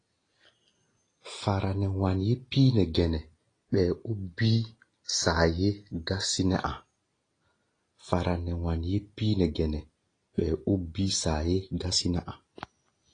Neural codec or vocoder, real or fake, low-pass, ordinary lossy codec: none; real; 9.9 kHz; AAC, 32 kbps